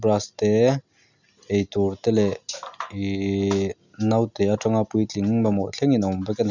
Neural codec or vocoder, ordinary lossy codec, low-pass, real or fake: none; none; 7.2 kHz; real